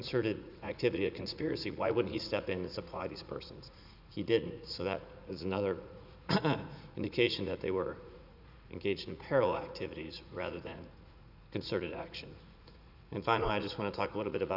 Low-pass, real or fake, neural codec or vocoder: 5.4 kHz; fake; vocoder, 44.1 kHz, 80 mel bands, Vocos